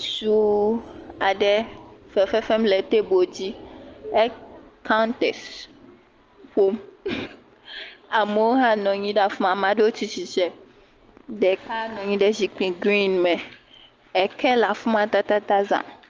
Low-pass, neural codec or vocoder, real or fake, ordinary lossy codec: 7.2 kHz; none; real; Opus, 24 kbps